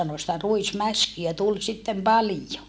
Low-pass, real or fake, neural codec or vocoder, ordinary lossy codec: none; real; none; none